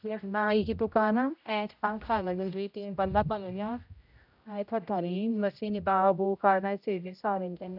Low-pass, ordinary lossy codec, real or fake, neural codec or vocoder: 5.4 kHz; none; fake; codec, 16 kHz, 0.5 kbps, X-Codec, HuBERT features, trained on general audio